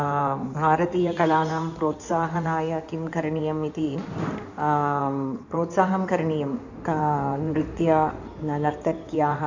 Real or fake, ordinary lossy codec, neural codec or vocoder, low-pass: fake; none; codec, 16 kHz in and 24 kHz out, 2.2 kbps, FireRedTTS-2 codec; 7.2 kHz